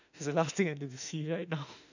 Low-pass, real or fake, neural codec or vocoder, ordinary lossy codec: 7.2 kHz; fake; autoencoder, 48 kHz, 32 numbers a frame, DAC-VAE, trained on Japanese speech; none